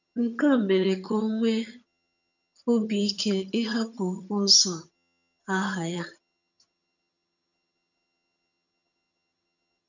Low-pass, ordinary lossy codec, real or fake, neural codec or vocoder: 7.2 kHz; none; fake; vocoder, 22.05 kHz, 80 mel bands, HiFi-GAN